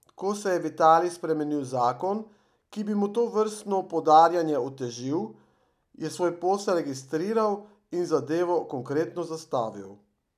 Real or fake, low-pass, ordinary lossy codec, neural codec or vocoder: real; 14.4 kHz; none; none